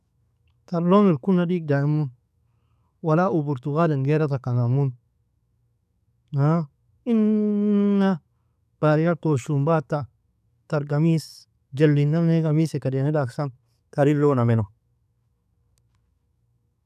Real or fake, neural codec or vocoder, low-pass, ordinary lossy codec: fake; codec, 44.1 kHz, 7.8 kbps, DAC; 14.4 kHz; none